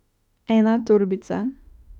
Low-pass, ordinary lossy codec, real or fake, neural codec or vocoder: 19.8 kHz; none; fake; autoencoder, 48 kHz, 32 numbers a frame, DAC-VAE, trained on Japanese speech